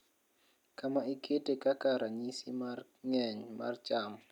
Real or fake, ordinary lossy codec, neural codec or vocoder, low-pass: real; none; none; 19.8 kHz